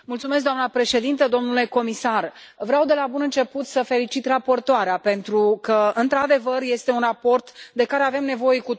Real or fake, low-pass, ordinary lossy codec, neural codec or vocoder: real; none; none; none